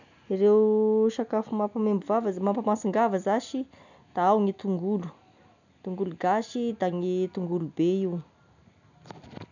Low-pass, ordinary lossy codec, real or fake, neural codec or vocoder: 7.2 kHz; none; real; none